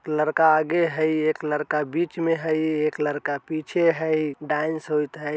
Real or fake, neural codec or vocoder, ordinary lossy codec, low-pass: real; none; none; none